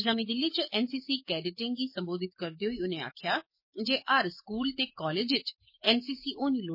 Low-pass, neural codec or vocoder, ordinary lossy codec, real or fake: 5.4 kHz; codec, 44.1 kHz, 7.8 kbps, DAC; MP3, 24 kbps; fake